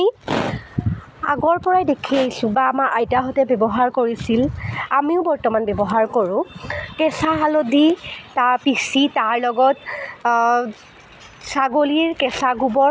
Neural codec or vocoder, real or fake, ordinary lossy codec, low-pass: none; real; none; none